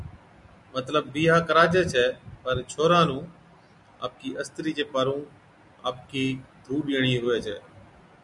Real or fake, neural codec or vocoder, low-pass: real; none; 10.8 kHz